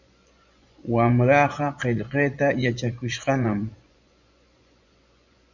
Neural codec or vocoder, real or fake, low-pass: vocoder, 24 kHz, 100 mel bands, Vocos; fake; 7.2 kHz